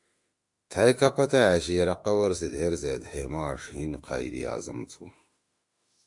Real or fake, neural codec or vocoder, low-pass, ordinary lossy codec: fake; autoencoder, 48 kHz, 32 numbers a frame, DAC-VAE, trained on Japanese speech; 10.8 kHz; AAC, 64 kbps